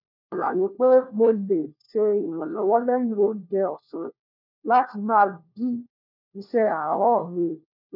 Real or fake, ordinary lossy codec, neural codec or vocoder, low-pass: fake; none; codec, 16 kHz, 1 kbps, FunCodec, trained on LibriTTS, 50 frames a second; 5.4 kHz